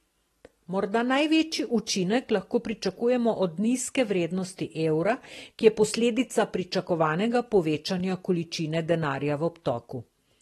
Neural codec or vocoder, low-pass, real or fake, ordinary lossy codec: none; 19.8 kHz; real; AAC, 32 kbps